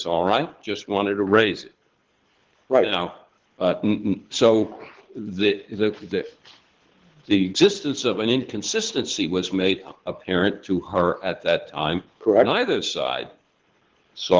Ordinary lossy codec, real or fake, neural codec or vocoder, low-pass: Opus, 16 kbps; fake; codec, 24 kHz, 6 kbps, HILCodec; 7.2 kHz